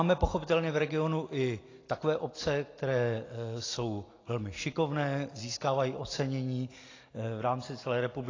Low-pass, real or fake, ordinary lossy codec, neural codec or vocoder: 7.2 kHz; real; AAC, 32 kbps; none